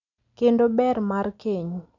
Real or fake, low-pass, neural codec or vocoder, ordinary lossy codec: real; 7.2 kHz; none; none